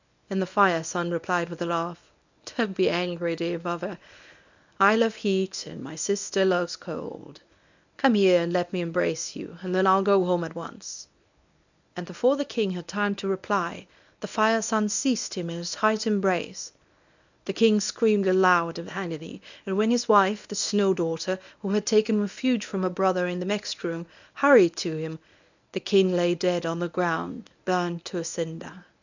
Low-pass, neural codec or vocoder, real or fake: 7.2 kHz; codec, 24 kHz, 0.9 kbps, WavTokenizer, medium speech release version 1; fake